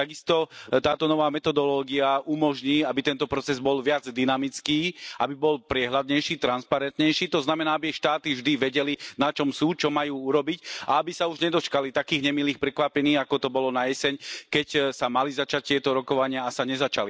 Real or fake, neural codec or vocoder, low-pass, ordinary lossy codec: real; none; none; none